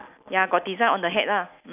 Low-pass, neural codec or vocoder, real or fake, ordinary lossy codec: 3.6 kHz; none; real; none